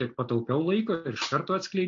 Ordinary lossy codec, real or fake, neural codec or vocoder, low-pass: AAC, 48 kbps; real; none; 7.2 kHz